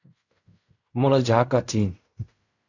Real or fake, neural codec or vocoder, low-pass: fake; codec, 16 kHz in and 24 kHz out, 0.4 kbps, LongCat-Audio-Codec, fine tuned four codebook decoder; 7.2 kHz